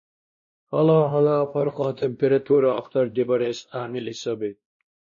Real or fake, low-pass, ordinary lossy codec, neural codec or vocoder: fake; 7.2 kHz; MP3, 32 kbps; codec, 16 kHz, 1 kbps, X-Codec, WavLM features, trained on Multilingual LibriSpeech